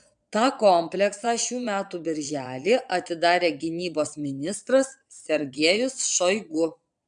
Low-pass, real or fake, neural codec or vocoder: 9.9 kHz; fake; vocoder, 22.05 kHz, 80 mel bands, Vocos